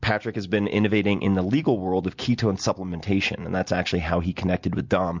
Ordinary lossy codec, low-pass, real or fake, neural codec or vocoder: MP3, 64 kbps; 7.2 kHz; real; none